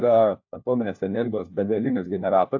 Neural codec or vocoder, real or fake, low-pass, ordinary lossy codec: codec, 16 kHz, 1 kbps, FunCodec, trained on LibriTTS, 50 frames a second; fake; 7.2 kHz; AAC, 48 kbps